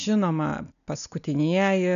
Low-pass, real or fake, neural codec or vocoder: 7.2 kHz; real; none